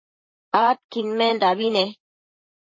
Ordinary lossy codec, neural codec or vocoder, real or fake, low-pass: MP3, 32 kbps; vocoder, 44.1 kHz, 128 mel bands, Pupu-Vocoder; fake; 7.2 kHz